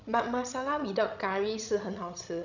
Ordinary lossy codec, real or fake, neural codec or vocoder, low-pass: none; fake; codec, 16 kHz, 16 kbps, FreqCodec, larger model; 7.2 kHz